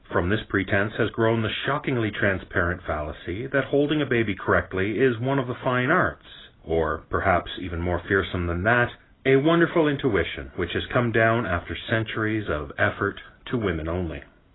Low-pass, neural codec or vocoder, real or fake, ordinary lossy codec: 7.2 kHz; none; real; AAC, 16 kbps